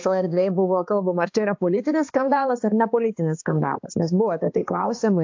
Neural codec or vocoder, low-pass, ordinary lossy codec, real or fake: codec, 16 kHz, 2 kbps, X-Codec, HuBERT features, trained on balanced general audio; 7.2 kHz; AAC, 48 kbps; fake